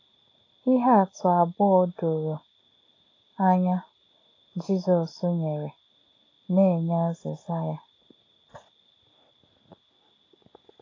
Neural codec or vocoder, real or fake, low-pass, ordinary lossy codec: none; real; 7.2 kHz; AAC, 32 kbps